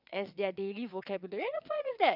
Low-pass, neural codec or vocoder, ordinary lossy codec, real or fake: 5.4 kHz; vocoder, 22.05 kHz, 80 mel bands, WaveNeXt; none; fake